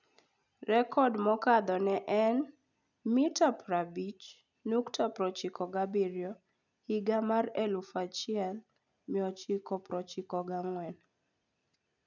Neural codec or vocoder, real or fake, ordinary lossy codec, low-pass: none; real; none; 7.2 kHz